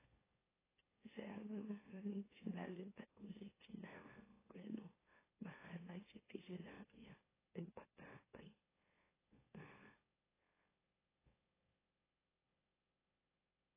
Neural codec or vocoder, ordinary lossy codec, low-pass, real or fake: autoencoder, 44.1 kHz, a latent of 192 numbers a frame, MeloTTS; AAC, 16 kbps; 3.6 kHz; fake